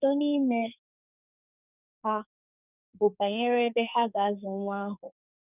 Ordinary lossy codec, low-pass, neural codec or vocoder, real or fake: none; 3.6 kHz; codec, 44.1 kHz, 2.6 kbps, SNAC; fake